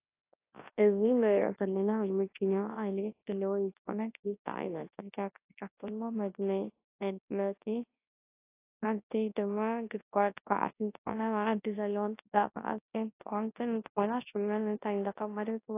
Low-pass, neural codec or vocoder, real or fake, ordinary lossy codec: 3.6 kHz; codec, 24 kHz, 0.9 kbps, WavTokenizer, large speech release; fake; AAC, 24 kbps